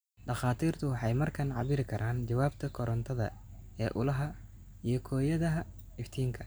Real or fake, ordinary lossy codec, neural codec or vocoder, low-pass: real; none; none; none